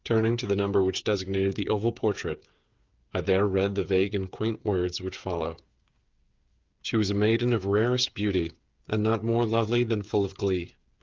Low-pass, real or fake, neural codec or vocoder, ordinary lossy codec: 7.2 kHz; fake; codec, 16 kHz, 8 kbps, FreqCodec, smaller model; Opus, 24 kbps